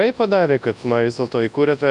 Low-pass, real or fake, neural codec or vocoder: 10.8 kHz; fake; codec, 24 kHz, 0.9 kbps, WavTokenizer, large speech release